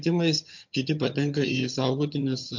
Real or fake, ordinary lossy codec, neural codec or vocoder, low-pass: fake; MP3, 48 kbps; vocoder, 22.05 kHz, 80 mel bands, HiFi-GAN; 7.2 kHz